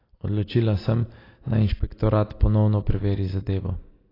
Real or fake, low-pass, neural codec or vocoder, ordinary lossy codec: real; 5.4 kHz; none; AAC, 24 kbps